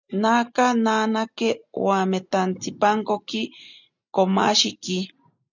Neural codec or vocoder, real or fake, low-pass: none; real; 7.2 kHz